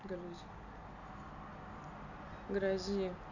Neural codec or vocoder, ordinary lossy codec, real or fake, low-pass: none; none; real; 7.2 kHz